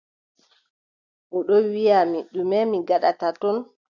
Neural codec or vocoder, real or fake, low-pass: none; real; 7.2 kHz